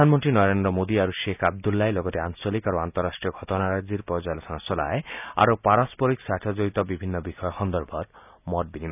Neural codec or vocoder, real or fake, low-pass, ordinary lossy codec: none; real; 3.6 kHz; none